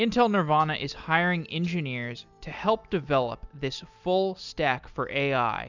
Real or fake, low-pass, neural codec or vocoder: real; 7.2 kHz; none